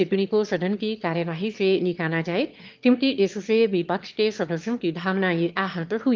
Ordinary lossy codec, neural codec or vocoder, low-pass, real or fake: Opus, 32 kbps; autoencoder, 22.05 kHz, a latent of 192 numbers a frame, VITS, trained on one speaker; 7.2 kHz; fake